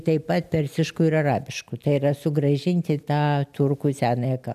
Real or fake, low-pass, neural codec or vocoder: real; 14.4 kHz; none